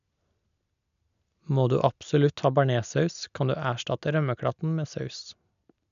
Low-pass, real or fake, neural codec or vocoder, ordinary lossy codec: 7.2 kHz; real; none; none